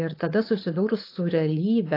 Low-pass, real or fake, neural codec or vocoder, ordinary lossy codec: 5.4 kHz; fake; codec, 16 kHz, 4.8 kbps, FACodec; AAC, 32 kbps